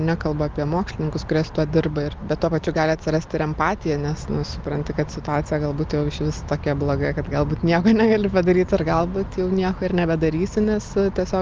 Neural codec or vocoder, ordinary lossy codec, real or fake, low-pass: none; Opus, 32 kbps; real; 7.2 kHz